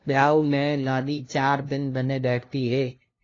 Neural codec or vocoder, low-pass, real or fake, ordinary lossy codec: codec, 16 kHz, 0.5 kbps, FunCodec, trained on LibriTTS, 25 frames a second; 7.2 kHz; fake; AAC, 32 kbps